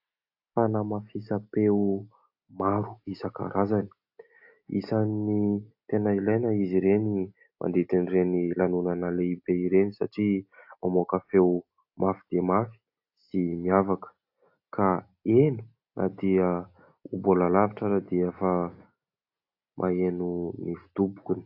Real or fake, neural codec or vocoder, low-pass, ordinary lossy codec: real; none; 5.4 kHz; AAC, 48 kbps